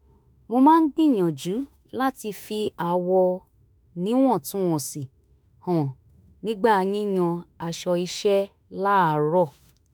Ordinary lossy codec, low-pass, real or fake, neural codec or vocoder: none; none; fake; autoencoder, 48 kHz, 32 numbers a frame, DAC-VAE, trained on Japanese speech